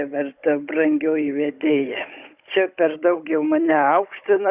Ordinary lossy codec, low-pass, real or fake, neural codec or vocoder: Opus, 64 kbps; 3.6 kHz; fake; codec, 16 kHz, 16 kbps, FunCodec, trained on Chinese and English, 50 frames a second